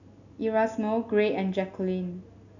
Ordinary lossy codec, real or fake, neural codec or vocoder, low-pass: none; real; none; 7.2 kHz